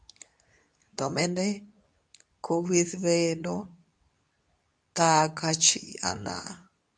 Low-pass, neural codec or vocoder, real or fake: 9.9 kHz; codec, 24 kHz, 0.9 kbps, WavTokenizer, medium speech release version 2; fake